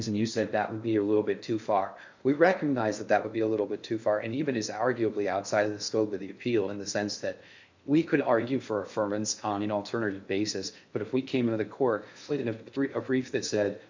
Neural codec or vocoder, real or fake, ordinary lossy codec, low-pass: codec, 16 kHz in and 24 kHz out, 0.6 kbps, FocalCodec, streaming, 4096 codes; fake; MP3, 48 kbps; 7.2 kHz